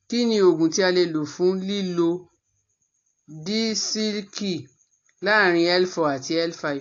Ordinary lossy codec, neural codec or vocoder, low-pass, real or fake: AAC, 48 kbps; none; 7.2 kHz; real